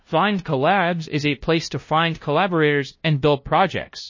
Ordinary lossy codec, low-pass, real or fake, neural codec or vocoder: MP3, 32 kbps; 7.2 kHz; fake; codec, 16 kHz, 0.5 kbps, FunCodec, trained on LibriTTS, 25 frames a second